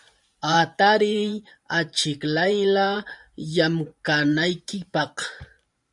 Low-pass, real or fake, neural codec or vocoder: 10.8 kHz; fake; vocoder, 44.1 kHz, 128 mel bands every 512 samples, BigVGAN v2